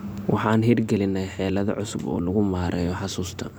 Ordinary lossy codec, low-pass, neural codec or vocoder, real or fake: none; none; none; real